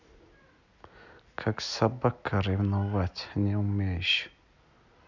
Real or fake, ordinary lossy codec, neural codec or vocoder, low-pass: real; none; none; 7.2 kHz